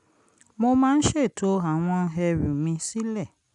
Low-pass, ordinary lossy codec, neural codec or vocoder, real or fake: 10.8 kHz; none; none; real